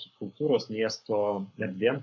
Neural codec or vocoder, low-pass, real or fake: codec, 44.1 kHz, 7.8 kbps, Pupu-Codec; 7.2 kHz; fake